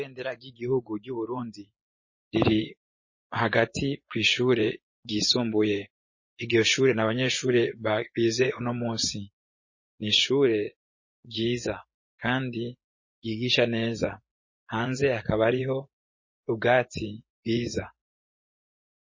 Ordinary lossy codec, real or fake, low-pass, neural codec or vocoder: MP3, 32 kbps; fake; 7.2 kHz; vocoder, 24 kHz, 100 mel bands, Vocos